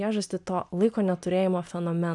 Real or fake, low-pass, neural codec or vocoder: real; 10.8 kHz; none